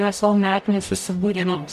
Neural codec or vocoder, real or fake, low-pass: codec, 44.1 kHz, 0.9 kbps, DAC; fake; 14.4 kHz